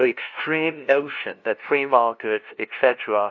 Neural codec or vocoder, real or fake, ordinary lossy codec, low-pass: codec, 16 kHz, 0.5 kbps, FunCodec, trained on LibriTTS, 25 frames a second; fake; AAC, 48 kbps; 7.2 kHz